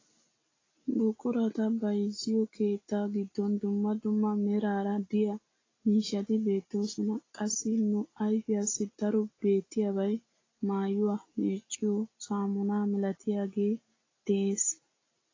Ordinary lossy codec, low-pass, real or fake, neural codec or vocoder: AAC, 32 kbps; 7.2 kHz; real; none